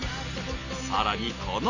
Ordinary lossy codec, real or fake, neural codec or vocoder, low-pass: none; real; none; 7.2 kHz